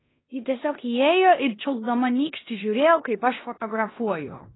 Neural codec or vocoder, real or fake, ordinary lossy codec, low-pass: codec, 16 kHz in and 24 kHz out, 0.9 kbps, LongCat-Audio-Codec, four codebook decoder; fake; AAC, 16 kbps; 7.2 kHz